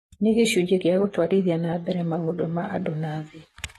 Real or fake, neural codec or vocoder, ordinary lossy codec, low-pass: fake; codec, 44.1 kHz, 7.8 kbps, DAC; AAC, 32 kbps; 19.8 kHz